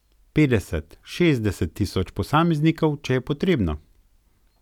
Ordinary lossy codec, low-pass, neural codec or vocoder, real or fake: none; 19.8 kHz; none; real